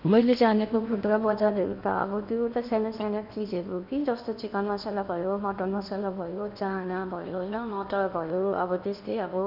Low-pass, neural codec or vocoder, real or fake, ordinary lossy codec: 5.4 kHz; codec, 16 kHz in and 24 kHz out, 0.8 kbps, FocalCodec, streaming, 65536 codes; fake; none